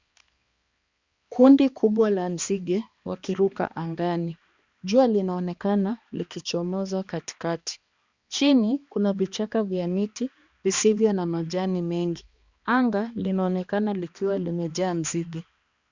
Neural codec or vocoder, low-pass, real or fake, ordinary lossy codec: codec, 16 kHz, 2 kbps, X-Codec, HuBERT features, trained on balanced general audio; 7.2 kHz; fake; Opus, 64 kbps